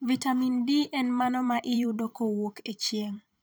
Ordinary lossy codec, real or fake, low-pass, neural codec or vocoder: none; fake; none; vocoder, 44.1 kHz, 128 mel bands every 512 samples, BigVGAN v2